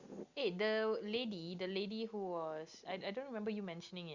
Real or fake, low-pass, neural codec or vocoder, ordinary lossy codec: real; 7.2 kHz; none; Opus, 64 kbps